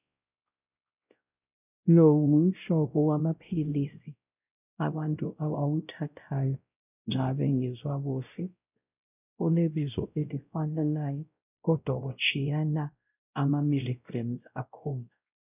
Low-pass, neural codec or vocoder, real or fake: 3.6 kHz; codec, 16 kHz, 0.5 kbps, X-Codec, WavLM features, trained on Multilingual LibriSpeech; fake